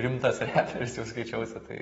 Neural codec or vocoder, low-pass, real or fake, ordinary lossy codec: none; 19.8 kHz; real; AAC, 24 kbps